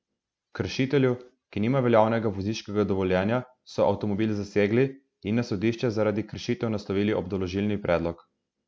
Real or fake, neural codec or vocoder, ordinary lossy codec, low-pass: real; none; none; none